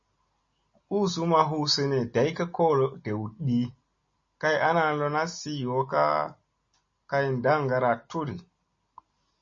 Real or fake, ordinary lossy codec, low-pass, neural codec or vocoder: real; MP3, 32 kbps; 7.2 kHz; none